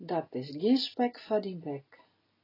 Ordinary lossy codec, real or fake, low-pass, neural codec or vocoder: AAC, 24 kbps; real; 5.4 kHz; none